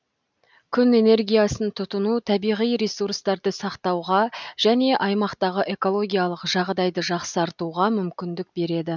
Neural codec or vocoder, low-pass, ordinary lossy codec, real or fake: none; 7.2 kHz; none; real